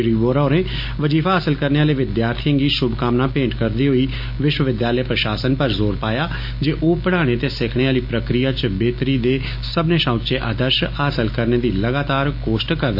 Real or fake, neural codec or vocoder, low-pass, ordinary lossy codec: real; none; 5.4 kHz; MP3, 32 kbps